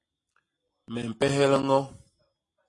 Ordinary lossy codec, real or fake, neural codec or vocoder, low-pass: AAC, 32 kbps; real; none; 10.8 kHz